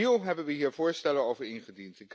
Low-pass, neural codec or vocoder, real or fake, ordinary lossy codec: none; none; real; none